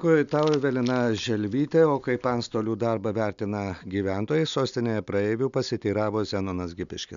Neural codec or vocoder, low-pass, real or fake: none; 7.2 kHz; real